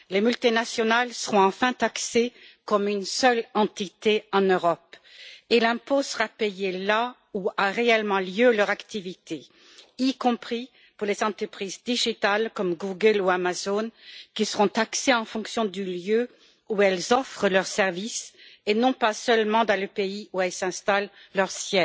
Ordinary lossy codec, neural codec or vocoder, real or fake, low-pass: none; none; real; none